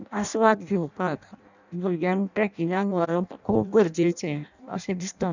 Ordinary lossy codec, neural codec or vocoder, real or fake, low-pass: none; codec, 16 kHz in and 24 kHz out, 0.6 kbps, FireRedTTS-2 codec; fake; 7.2 kHz